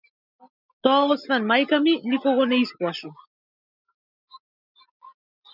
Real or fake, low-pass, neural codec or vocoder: real; 5.4 kHz; none